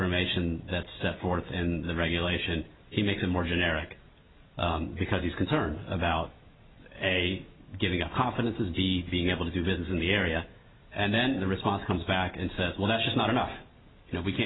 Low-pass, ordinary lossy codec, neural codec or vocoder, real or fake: 7.2 kHz; AAC, 16 kbps; none; real